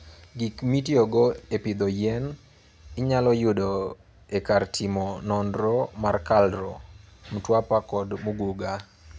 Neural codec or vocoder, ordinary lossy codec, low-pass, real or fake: none; none; none; real